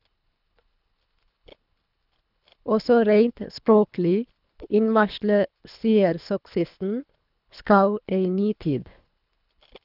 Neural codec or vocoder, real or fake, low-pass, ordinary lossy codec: codec, 24 kHz, 3 kbps, HILCodec; fake; 5.4 kHz; none